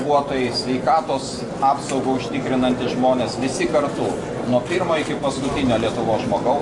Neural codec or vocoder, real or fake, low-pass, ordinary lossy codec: none; real; 10.8 kHz; AAC, 48 kbps